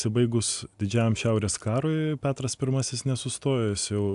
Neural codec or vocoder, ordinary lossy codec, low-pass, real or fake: none; AAC, 96 kbps; 10.8 kHz; real